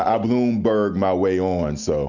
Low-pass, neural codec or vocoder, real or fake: 7.2 kHz; none; real